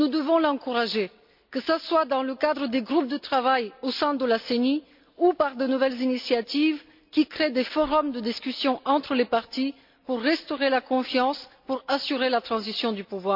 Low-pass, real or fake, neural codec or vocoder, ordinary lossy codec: 5.4 kHz; real; none; MP3, 48 kbps